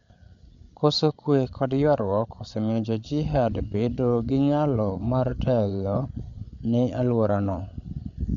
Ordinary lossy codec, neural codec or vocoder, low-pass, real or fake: MP3, 64 kbps; codec, 16 kHz, 8 kbps, FreqCodec, larger model; 7.2 kHz; fake